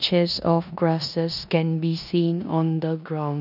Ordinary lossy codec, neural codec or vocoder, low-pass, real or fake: none; codec, 16 kHz in and 24 kHz out, 0.9 kbps, LongCat-Audio-Codec, four codebook decoder; 5.4 kHz; fake